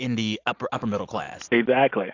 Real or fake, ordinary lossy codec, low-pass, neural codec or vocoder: real; AAC, 48 kbps; 7.2 kHz; none